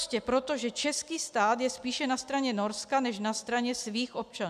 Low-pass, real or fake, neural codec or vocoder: 14.4 kHz; real; none